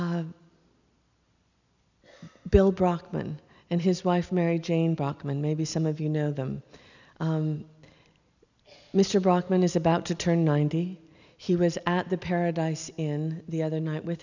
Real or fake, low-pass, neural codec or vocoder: real; 7.2 kHz; none